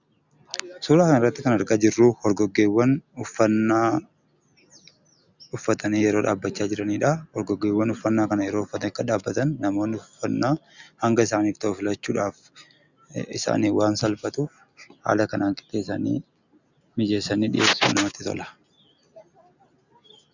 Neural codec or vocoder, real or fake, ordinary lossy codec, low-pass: none; real; Opus, 64 kbps; 7.2 kHz